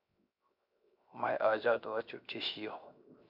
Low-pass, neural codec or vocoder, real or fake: 5.4 kHz; codec, 16 kHz, 0.7 kbps, FocalCodec; fake